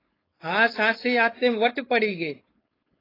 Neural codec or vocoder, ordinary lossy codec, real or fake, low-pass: codec, 16 kHz, 4.8 kbps, FACodec; AAC, 24 kbps; fake; 5.4 kHz